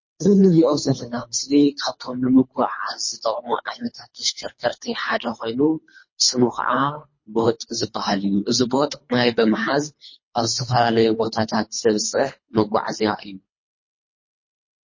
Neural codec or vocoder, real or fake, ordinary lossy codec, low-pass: codec, 24 kHz, 3 kbps, HILCodec; fake; MP3, 32 kbps; 7.2 kHz